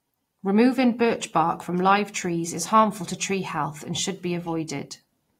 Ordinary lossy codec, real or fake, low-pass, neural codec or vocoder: AAC, 48 kbps; real; 19.8 kHz; none